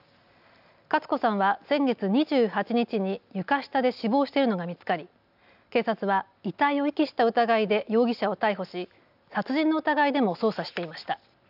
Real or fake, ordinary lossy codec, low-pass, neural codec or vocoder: real; none; 5.4 kHz; none